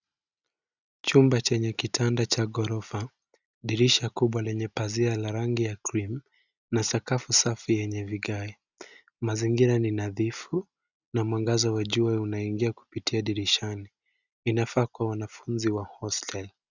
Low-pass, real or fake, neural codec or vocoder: 7.2 kHz; real; none